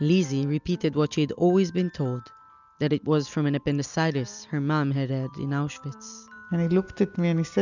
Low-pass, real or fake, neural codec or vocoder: 7.2 kHz; real; none